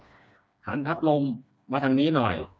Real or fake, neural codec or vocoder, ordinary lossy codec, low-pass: fake; codec, 16 kHz, 2 kbps, FreqCodec, smaller model; none; none